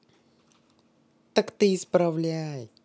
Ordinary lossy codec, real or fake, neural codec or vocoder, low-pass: none; real; none; none